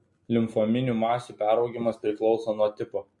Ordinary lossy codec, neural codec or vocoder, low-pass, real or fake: MP3, 48 kbps; none; 10.8 kHz; real